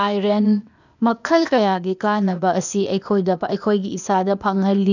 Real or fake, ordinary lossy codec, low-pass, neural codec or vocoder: fake; none; 7.2 kHz; codec, 16 kHz, 0.8 kbps, ZipCodec